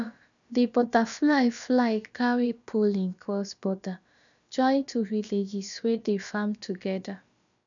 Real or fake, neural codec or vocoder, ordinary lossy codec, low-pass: fake; codec, 16 kHz, about 1 kbps, DyCAST, with the encoder's durations; MP3, 96 kbps; 7.2 kHz